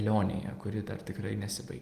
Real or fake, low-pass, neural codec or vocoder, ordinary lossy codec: real; 14.4 kHz; none; Opus, 24 kbps